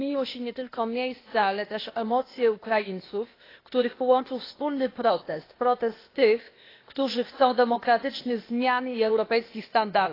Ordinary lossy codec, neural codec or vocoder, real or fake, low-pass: AAC, 24 kbps; codec, 16 kHz, 0.8 kbps, ZipCodec; fake; 5.4 kHz